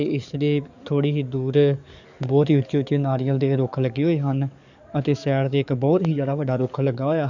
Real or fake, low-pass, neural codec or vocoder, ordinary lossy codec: fake; 7.2 kHz; codec, 44.1 kHz, 7.8 kbps, DAC; none